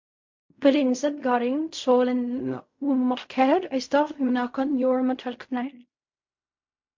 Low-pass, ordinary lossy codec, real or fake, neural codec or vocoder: 7.2 kHz; MP3, 48 kbps; fake; codec, 16 kHz in and 24 kHz out, 0.4 kbps, LongCat-Audio-Codec, fine tuned four codebook decoder